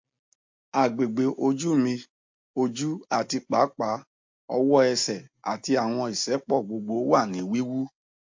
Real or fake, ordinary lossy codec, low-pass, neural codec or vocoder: real; MP3, 48 kbps; 7.2 kHz; none